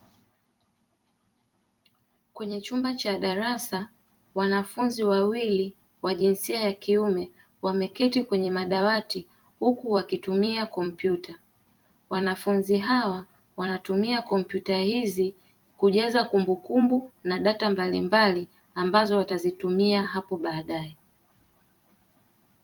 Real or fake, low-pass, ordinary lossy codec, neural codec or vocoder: fake; 19.8 kHz; Opus, 32 kbps; vocoder, 44.1 kHz, 128 mel bands every 256 samples, BigVGAN v2